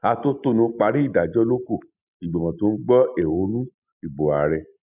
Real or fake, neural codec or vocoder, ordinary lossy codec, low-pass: real; none; none; 3.6 kHz